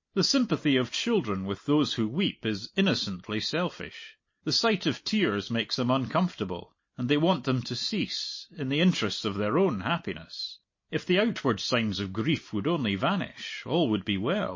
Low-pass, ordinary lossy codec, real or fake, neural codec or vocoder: 7.2 kHz; MP3, 32 kbps; real; none